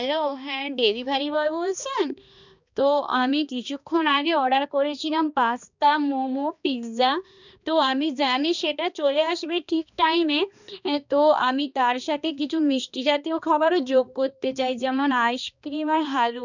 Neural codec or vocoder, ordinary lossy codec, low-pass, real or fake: codec, 16 kHz, 2 kbps, X-Codec, HuBERT features, trained on balanced general audio; none; 7.2 kHz; fake